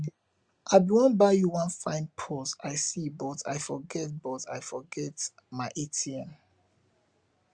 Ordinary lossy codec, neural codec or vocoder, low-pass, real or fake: Opus, 64 kbps; none; 9.9 kHz; real